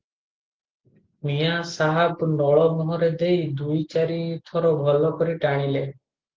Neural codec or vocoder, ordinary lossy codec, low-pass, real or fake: none; Opus, 16 kbps; 7.2 kHz; real